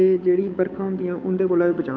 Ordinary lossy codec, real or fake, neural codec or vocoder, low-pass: none; fake; codec, 16 kHz, 8 kbps, FunCodec, trained on Chinese and English, 25 frames a second; none